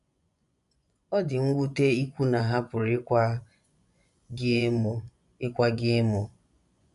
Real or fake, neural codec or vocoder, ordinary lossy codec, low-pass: fake; vocoder, 24 kHz, 100 mel bands, Vocos; none; 10.8 kHz